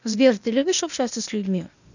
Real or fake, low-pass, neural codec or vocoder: fake; 7.2 kHz; codec, 16 kHz, 0.8 kbps, ZipCodec